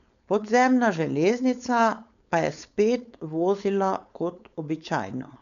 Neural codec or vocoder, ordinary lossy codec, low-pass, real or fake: codec, 16 kHz, 4.8 kbps, FACodec; none; 7.2 kHz; fake